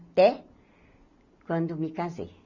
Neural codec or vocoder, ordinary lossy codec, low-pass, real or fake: none; none; 7.2 kHz; real